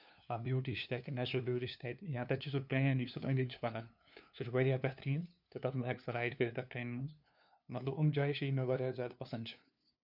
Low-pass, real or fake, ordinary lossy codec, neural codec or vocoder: 5.4 kHz; fake; none; codec, 16 kHz, 2 kbps, FunCodec, trained on LibriTTS, 25 frames a second